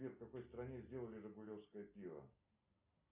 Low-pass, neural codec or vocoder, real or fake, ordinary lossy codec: 3.6 kHz; none; real; Opus, 64 kbps